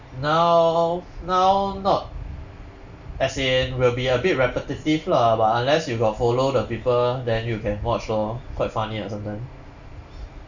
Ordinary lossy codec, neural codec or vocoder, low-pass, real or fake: none; none; 7.2 kHz; real